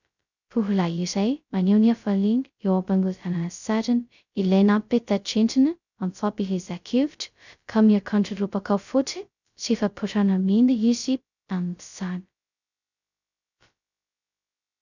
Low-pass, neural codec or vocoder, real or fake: 7.2 kHz; codec, 16 kHz, 0.2 kbps, FocalCodec; fake